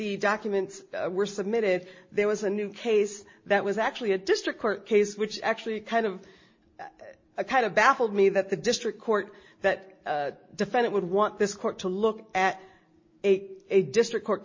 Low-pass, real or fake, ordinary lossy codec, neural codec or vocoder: 7.2 kHz; real; MP3, 32 kbps; none